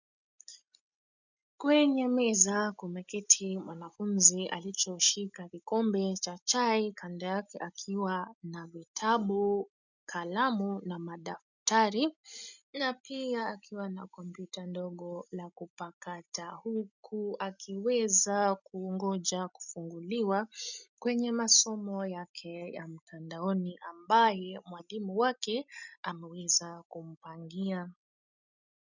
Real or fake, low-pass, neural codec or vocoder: real; 7.2 kHz; none